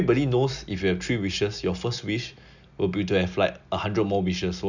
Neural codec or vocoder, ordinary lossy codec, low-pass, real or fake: none; none; 7.2 kHz; real